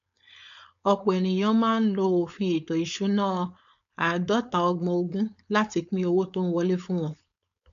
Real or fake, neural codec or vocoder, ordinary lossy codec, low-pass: fake; codec, 16 kHz, 4.8 kbps, FACodec; none; 7.2 kHz